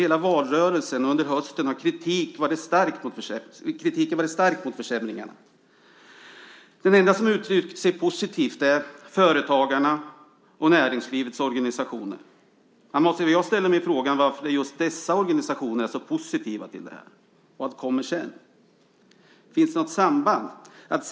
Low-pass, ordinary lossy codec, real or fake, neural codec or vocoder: none; none; real; none